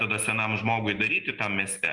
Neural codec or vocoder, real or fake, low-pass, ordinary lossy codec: none; real; 10.8 kHz; Opus, 32 kbps